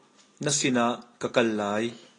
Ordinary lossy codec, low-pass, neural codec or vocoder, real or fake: AAC, 32 kbps; 9.9 kHz; none; real